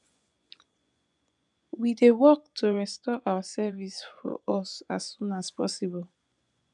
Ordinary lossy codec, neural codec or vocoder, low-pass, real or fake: none; vocoder, 24 kHz, 100 mel bands, Vocos; 10.8 kHz; fake